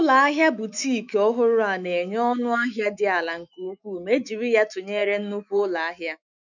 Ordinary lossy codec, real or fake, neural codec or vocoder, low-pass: none; fake; autoencoder, 48 kHz, 128 numbers a frame, DAC-VAE, trained on Japanese speech; 7.2 kHz